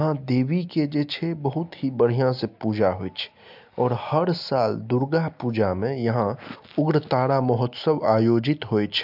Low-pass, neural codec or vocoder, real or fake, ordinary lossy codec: 5.4 kHz; none; real; MP3, 48 kbps